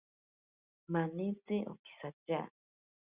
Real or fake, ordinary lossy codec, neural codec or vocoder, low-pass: real; Opus, 64 kbps; none; 3.6 kHz